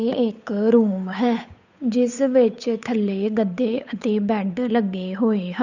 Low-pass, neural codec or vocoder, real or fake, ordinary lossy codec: 7.2 kHz; codec, 16 kHz, 8 kbps, FunCodec, trained on Chinese and English, 25 frames a second; fake; none